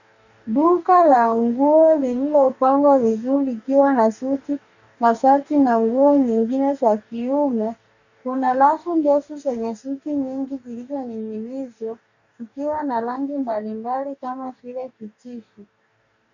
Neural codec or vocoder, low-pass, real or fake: codec, 44.1 kHz, 2.6 kbps, DAC; 7.2 kHz; fake